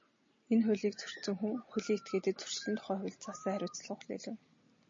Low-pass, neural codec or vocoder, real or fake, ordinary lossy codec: 7.2 kHz; none; real; MP3, 32 kbps